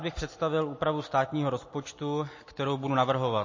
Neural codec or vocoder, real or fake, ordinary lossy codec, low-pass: none; real; MP3, 32 kbps; 7.2 kHz